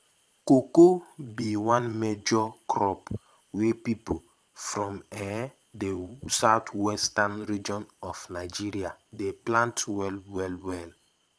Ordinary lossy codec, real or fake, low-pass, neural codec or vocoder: none; fake; none; vocoder, 22.05 kHz, 80 mel bands, Vocos